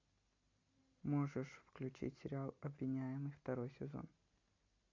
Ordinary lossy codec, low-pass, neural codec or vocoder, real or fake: MP3, 48 kbps; 7.2 kHz; none; real